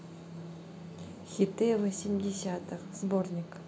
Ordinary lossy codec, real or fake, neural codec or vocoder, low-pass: none; real; none; none